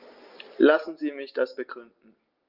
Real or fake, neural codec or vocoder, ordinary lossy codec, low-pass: real; none; Opus, 32 kbps; 5.4 kHz